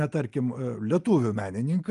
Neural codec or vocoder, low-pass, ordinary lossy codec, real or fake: none; 10.8 kHz; Opus, 32 kbps; real